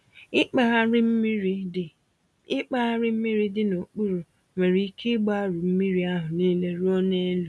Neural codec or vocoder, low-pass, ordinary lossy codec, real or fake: none; none; none; real